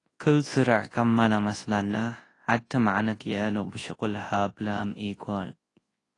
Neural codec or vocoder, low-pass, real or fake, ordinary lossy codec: codec, 24 kHz, 0.9 kbps, WavTokenizer, large speech release; 10.8 kHz; fake; AAC, 32 kbps